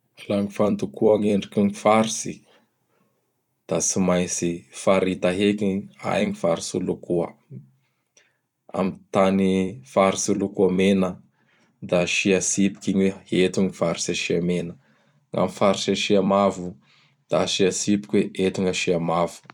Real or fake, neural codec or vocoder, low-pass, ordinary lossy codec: fake; vocoder, 44.1 kHz, 128 mel bands every 256 samples, BigVGAN v2; 19.8 kHz; none